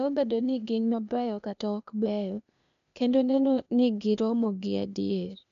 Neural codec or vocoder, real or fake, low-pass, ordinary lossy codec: codec, 16 kHz, 0.8 kbps, ZipCodec; fake; 7.2 kHz; none